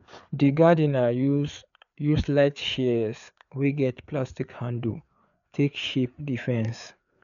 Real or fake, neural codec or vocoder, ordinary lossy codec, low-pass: fake; codec, 16 kHz, 4 kbps, FreqCodec, larger model; none; 7.2 kHz